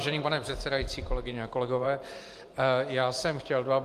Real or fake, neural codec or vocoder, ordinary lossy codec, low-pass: fake; vocoder, 44.1 kHz, 128 mel bands every 512 samples, BigVGAN v2; Opus, 32 kbps; 14.4 kHz